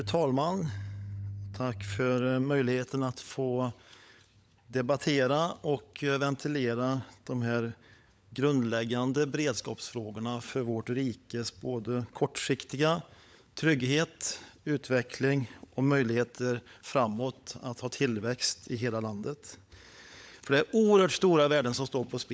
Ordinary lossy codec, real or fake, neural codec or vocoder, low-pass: none; fake; codec, 16 kHz, 16 kbps, FunCodec, trained on LibriTTS, 50 frames a second; none